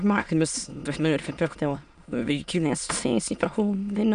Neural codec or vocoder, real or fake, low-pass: autoencoder, 22.05 kHz, a latent of 192 numbers a frame, VITS, trained on many speakers; fake; 9.9 kHz